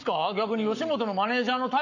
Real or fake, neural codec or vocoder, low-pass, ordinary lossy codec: fake; codec, 44.1 kHz, 7.8 kbps, Pupu-Codec; 7.2 kHz; none